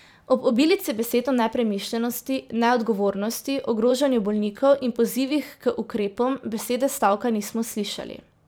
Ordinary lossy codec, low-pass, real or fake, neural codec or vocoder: none; none; fake; vocoder, 44.1 kHz, 128 mel bands every 512 samples, BigVGAN v2